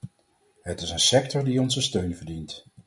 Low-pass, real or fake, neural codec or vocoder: 10.8 kHz; real; none